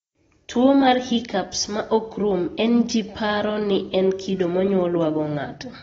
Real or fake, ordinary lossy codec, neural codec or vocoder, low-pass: real; AAC, 24 kbps; none; 19.8 kHz